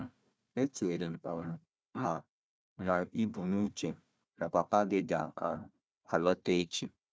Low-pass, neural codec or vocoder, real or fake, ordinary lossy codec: none; codec, 16 kHz, 1 kbps, FunCodec, trained on Chinese and English, 50 frames a second; fake; none